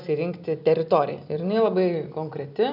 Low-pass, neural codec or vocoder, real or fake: 5.4 kHz; none; real